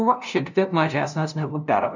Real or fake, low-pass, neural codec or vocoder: fake; 7.2 kHz; codec, 16 kHz, 0.5 kbps, FunCodec, trained on LibriTTS, 25 frames a second